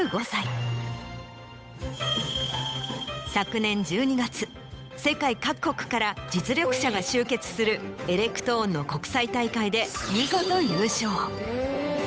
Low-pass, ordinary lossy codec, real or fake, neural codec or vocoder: none; none; fake; codec, 16 kHz, 8 kbps, FunCodec, trained on Chinese and English, 25 frames a second